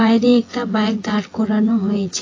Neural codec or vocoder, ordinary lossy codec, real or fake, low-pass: vocoder, 24 kHz, 100 mel bands, Vocos; AAC, 32 kbps; fake; 7.2 kHz